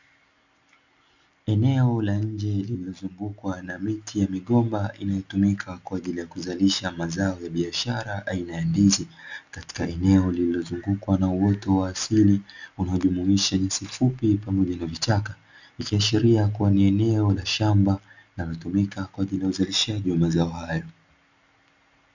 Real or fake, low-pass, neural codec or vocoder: real; 7.2 kHz; none